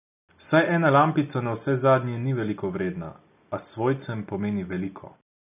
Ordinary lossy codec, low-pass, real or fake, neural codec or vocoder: none; 3.6 kHz; real; none